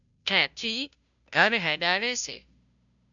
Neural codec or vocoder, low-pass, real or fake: codec, 16 kHz, 0.5 kbps, FunCodec, trained on Chinese and English, 25 frames a second; 7.2 kHz; fake